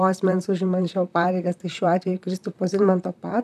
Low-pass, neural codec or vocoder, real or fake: 14.4 kHz; vocoder, 44.1 kHz, 128 mel bands, Pupu-Vocoder; fake